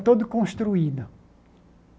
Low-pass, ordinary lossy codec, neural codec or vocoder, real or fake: none; none; none; real